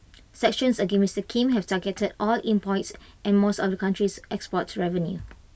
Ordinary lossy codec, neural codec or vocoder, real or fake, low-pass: none; none; real; none